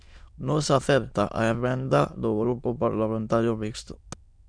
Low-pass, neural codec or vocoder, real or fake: 9.9 kHz; autoencoder, 22.05 kHz, a latent of 192 numbers a frame, VITS, trained on many speakers; fake